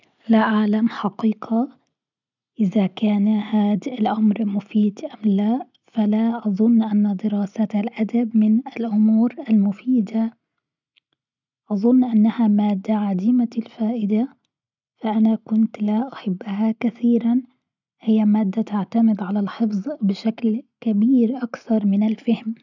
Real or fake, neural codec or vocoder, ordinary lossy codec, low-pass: real; none; none; 7.2 kHz